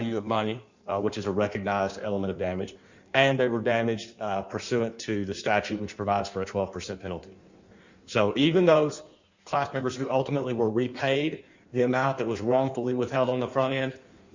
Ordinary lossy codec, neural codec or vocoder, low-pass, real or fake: Opus, 64 kbps; codec, 16 kHz in and 24 kHz out, 1.1 kbps, FireRedTTS-2 codec; 7.2 kHz; fake